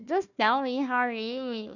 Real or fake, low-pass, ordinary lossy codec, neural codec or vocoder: fake; 7.2 kHz; none; codec, 16 kHz, 1 kbps, FunCodec, trained on Chinese and English, 50 frames a second